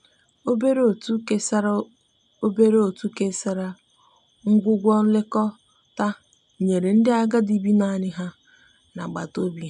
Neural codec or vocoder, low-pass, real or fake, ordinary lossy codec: none; 9.9 kHz; real; none